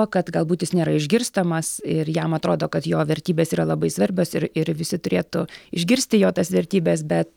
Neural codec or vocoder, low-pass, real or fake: none; 19.8 kHz; real